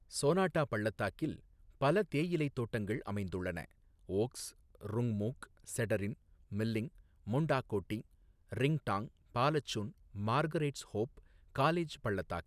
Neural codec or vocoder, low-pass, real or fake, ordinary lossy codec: none; 14.4 kHz; real; none